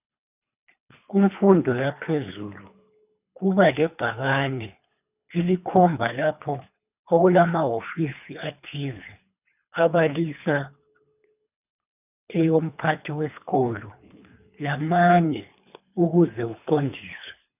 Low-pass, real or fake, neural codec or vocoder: 3.6 kHz; fake; codec, 24 kHz, 3 kbps, HILCodec